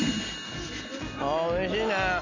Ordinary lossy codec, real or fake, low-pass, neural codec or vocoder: MP3, 48 kbps; real; 7.2 kHz; none